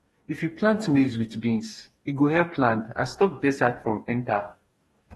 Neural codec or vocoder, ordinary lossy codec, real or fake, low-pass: codec, 44.1 kHz, 2.6 kbps, DAC; AAC, 32 kbps; fake; 19.8 kHz